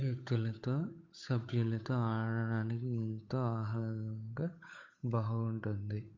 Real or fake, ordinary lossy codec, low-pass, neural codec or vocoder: fake; MP3, 48 kbps; 7.2 kHz; codec, 16 kHz, 8 kbps, FunCodec, trained on Chinese and English, 25 frames a second